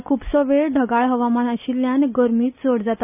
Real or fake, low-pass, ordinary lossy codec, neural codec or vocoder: real; 3.6 kHz; none; none